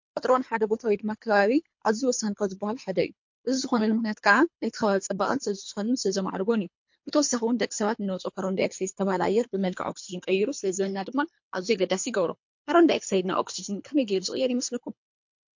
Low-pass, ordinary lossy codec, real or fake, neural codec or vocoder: 7.2 kHz; MP3, 48 kbps; fake; codec, 24 kHz, 3 kbps, HILCodec